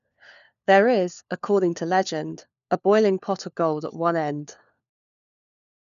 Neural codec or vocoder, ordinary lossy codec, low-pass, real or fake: codec, 16 kHz, 4 kbps, FunCodec, trained on LibriTTS, 50 frames a second; none; 7.2 kHz; fake